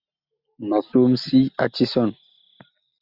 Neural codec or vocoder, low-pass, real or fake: none; 5.4 kHz; real